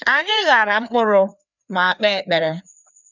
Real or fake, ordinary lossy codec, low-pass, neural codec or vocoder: fake; none; 7.2 kHz; codec, 16 kHz, 2 kbps, FreqCodec, larger model